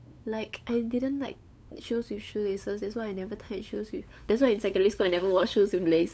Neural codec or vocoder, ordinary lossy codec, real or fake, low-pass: codec, 16 kHz, 8 kbps, FunCodec, trained on LibriTTS, 25 frames a second; none; fake; none